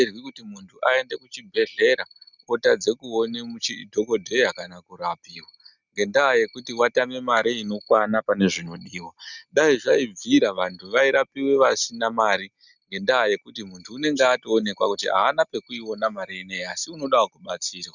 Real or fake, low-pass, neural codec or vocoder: real; 7.2 kHz; none